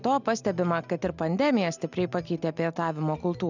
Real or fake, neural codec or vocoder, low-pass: real; none; 7.2 kHz